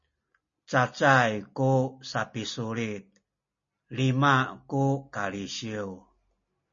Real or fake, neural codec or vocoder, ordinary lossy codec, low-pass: real; none; MP3, 32 kbps; 7.2 kHz